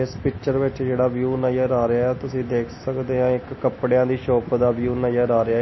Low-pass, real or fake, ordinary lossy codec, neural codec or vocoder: 7.2 kHz; real; MP3, 24 kbps; none